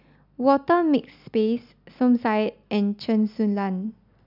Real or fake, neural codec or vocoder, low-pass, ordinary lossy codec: real; none; 5.4 kHz; none